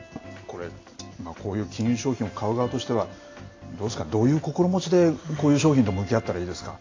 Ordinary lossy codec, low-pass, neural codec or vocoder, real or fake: AAC, 32 kbps; 7.2 kHz; none; real